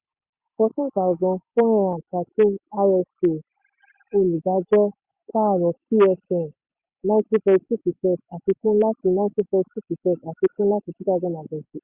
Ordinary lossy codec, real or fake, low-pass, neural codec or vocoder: Opus, 64 kbps; real; 3.6 kHz; none